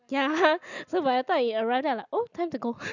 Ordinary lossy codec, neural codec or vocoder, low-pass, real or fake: none; none; 7.2 kHz; real